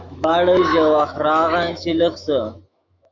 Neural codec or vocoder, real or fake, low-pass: autoencoder, 48 kHz, 128 numbers a frame, DAC-VAE, trained on Japanese speech; fake; 7.2 kHz